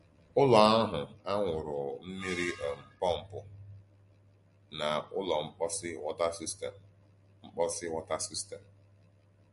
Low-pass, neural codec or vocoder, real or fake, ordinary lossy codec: 14.4 kHz; none; real; MP3, 48 kbps